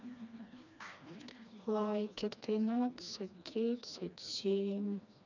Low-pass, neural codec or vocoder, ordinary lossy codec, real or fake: 7.2 kHz; codec, 16 kHz, 2 kbps, FreqCodec, smaller model; none; fake